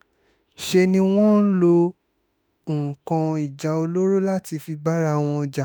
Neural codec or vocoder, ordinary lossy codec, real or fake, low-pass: autoencoder, 48 kHz, 32 numbers a frame, DAC-VAE, trained on Japanese speech; none; fake; none